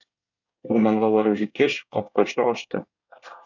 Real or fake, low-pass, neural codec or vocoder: fake; 7.2 kHz; codec, 24 kHz, 1 kbps, SNAC